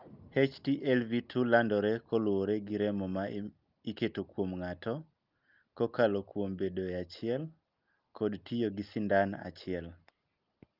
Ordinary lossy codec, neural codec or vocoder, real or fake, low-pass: Opus, 24 kbps; none; real; 5.4 kHz